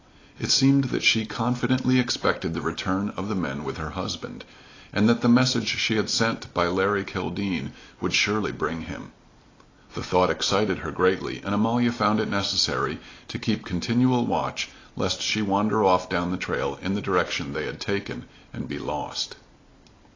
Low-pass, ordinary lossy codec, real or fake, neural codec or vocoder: 7.2 kHz; AAC, 32 kbps; real; none